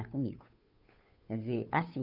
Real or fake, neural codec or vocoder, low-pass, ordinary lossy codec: fake; codec, 16 kHz, 2 kbps, FunCodec, trained on Chinese and English, 25 frames a second; 5.4 kHz; none